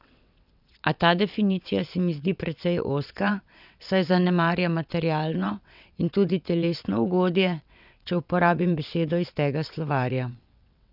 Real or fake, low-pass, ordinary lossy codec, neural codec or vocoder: fake; 5.4 kHz; none; vocoder, 22.05 kHz, 80 mel bands, WaveNeXt